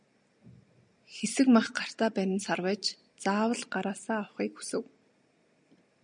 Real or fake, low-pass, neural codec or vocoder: real; 9.9 kHz; none